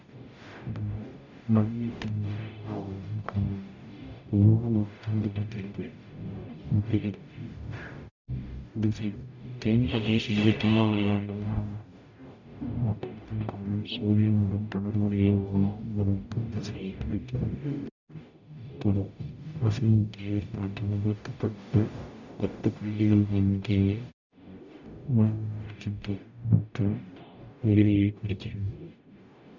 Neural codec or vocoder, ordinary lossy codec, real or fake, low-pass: codec, 44.1 kHz, 0.9 kbps, DAC; none; fake; 7.2 kHz